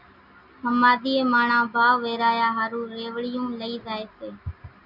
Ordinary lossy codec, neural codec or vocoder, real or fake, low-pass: MP3, 48 kbps; none; real; 5.4 kHz